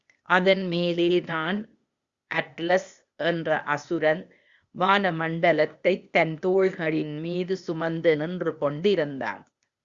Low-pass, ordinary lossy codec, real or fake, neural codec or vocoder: 7.2 kHz; Opus, 64 kbps; fake; codec, 16 kHz, 0.8 kbps, ZipCodec